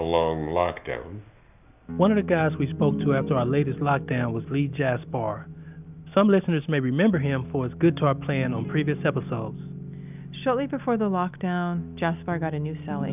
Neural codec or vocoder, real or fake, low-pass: none; real; 3.6 kHz